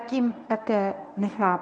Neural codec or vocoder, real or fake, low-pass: codec, 24 kHz, 0.9 kbps, WavTokenizer, medium speech release version 1; fake; 10.8 kHz